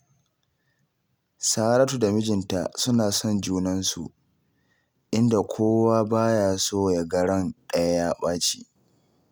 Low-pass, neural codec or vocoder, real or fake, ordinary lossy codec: none; none; real; none